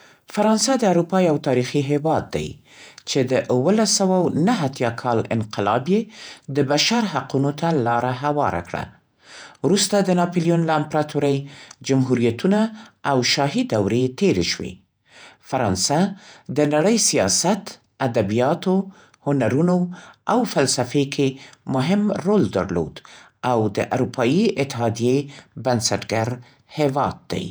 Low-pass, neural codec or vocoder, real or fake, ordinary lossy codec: none; vocoder, 48 kHz, 128 mel bands, Vocos; fake; none